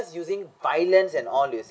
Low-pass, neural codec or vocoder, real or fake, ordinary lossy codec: none; none; real; none